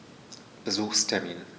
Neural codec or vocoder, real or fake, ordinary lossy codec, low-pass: none; real; none; none